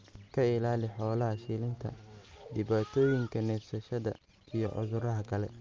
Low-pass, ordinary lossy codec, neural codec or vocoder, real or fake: 7.2 kHz; Opus, 24 kbps; none; real